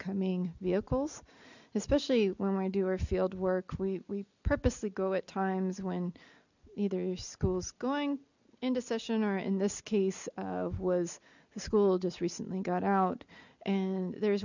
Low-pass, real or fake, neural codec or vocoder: 7.2 kHz; real; none